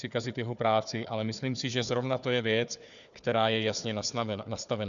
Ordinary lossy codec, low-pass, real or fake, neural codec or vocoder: MP3, 96 kbps; 7.2 kHz; fake; codec, 16 kHz, 4 kbps, FunCodec, trained on Chinese and English, 50 frames a second